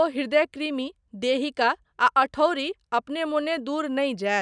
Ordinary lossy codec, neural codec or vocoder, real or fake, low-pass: none; none; real; 9.9 kHz